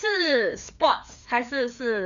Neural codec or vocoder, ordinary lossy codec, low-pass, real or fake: codec, 16 kHz, 2 kbps, FreqCodec, larger model; none; 7.2 kHz; fake